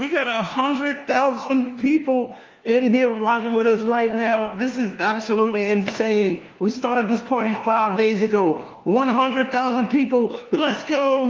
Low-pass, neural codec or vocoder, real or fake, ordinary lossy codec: 7.2 kHz; codec, 16 kHz, 1 kbps, FunCodec, trained on LibriTTS, 50 frames a second; fake; Opus, 32 kbps